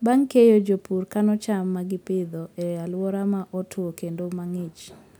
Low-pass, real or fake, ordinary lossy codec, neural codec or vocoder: none; real; none; none